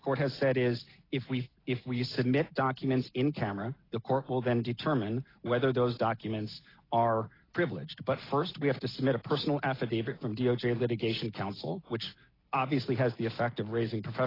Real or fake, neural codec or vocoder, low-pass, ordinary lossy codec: real; none; 5.4 kHz; AAC, 24 kbps